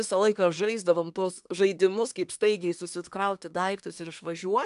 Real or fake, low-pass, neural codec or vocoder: fake; 10.8 kHz; codec, 24 kHz, 1 kbps, SNAC